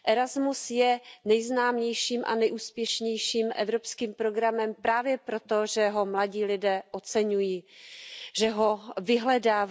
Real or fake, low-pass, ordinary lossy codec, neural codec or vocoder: real; none; none; none